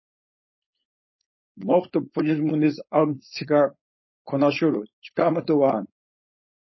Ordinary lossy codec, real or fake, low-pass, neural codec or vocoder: MP3, 24 kbps; fake; 7.2 kHz; codec, 16 kHz, 4.8 kbps, FACodec